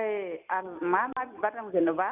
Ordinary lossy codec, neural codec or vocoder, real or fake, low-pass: none; none; real; 3.6 kHz